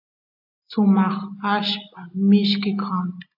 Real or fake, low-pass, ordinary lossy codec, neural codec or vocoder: real; 5.4 kHz; AAC, 48 kbps; none